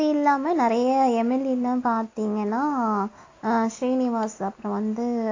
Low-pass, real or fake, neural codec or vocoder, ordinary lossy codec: 7.2 kHz; real; none; AAC, 32 kbps